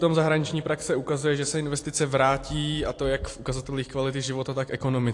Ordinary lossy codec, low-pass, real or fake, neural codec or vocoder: AAC, 48 kbps; 10.8 kHz; real; none